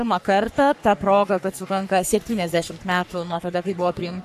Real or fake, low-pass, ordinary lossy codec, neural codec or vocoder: fake; 14.4 kHz; AAC, 96 kbps; codec, 44.1 kHz, 3.4 kbps, Pupu-Codec